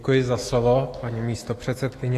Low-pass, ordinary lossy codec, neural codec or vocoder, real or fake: 14.4 kHz; AAC, 48 kbps; autoencoder, 48 kHz, 128 numbers a frame, DAC-VAE, trained on Japanese speech; fake